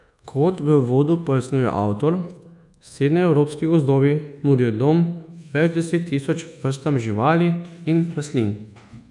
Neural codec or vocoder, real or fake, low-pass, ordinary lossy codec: codec, 24 kHz, 1.2 kbps, DualCodec; fake; 10.8 kHz; none